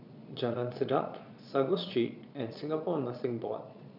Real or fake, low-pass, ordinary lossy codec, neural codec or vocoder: fake; 5.4 kHz; none; vocoder, 22.05 kHz, 80 mel bands, Vocos